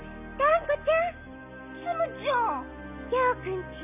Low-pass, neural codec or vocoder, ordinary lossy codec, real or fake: 3.6 kHz; none; none; real